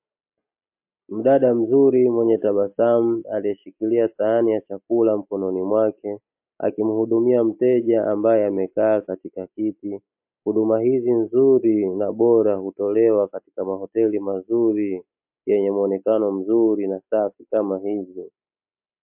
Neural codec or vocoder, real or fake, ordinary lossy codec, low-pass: none; real; MP3, 32 kbps; 3.6 kHz